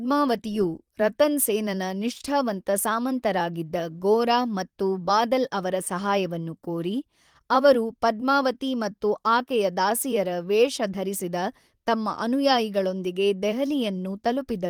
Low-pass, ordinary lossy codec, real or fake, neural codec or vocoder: 19.8 kHz; Opus, 24 kbps; fake; vocoder, 44.1 kHz, 128 mel bands, Pupu-Vocoder